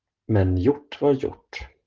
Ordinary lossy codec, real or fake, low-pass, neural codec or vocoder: Opus, 16 kbps; real; 7.2 kHz; none